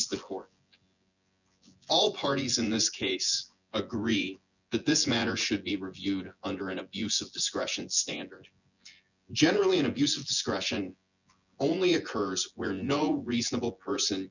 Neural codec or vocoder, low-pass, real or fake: vocoder, 24 kHz, 100 mel bands, Vocos; 7.2 kHz; fake